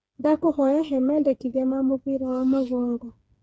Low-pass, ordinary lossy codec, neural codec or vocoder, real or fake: none; none; codec, 16 kHz, 4 kbps, FreqCodec, smaller model; fake